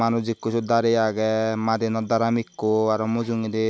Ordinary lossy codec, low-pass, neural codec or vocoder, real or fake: none; none; none; real